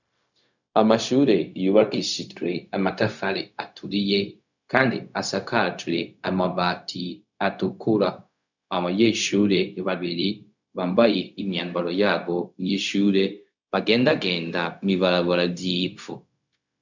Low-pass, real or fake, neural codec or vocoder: 7.2 kHz; fake; codec, 16 kHz, 0.4 kbps, LongCat-Audio-Codec